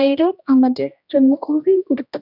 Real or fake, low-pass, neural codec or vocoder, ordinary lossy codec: fake; 5.4 kHz; codec, 16 kHz, 1 kbps, X-Codec, HuBERT features, trained on general audio; none